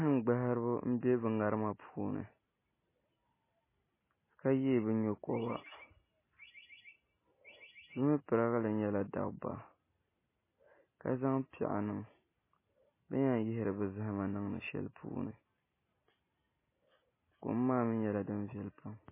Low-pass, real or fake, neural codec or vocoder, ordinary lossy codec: 3.6 kHz; real; none; MP3, 16 kbps